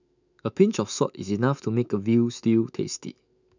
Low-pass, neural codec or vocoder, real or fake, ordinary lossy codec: 7.2 kHz; autoencoder, 48 kHz, 128 numbers a frame, DAC-VAE, trained on Japanese speech; fake; none